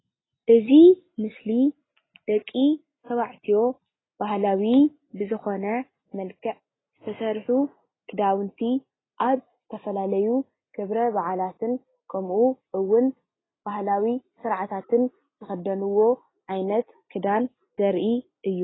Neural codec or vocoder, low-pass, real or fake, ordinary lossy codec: none; 7.2 kHz; real; AAC, 16 kbps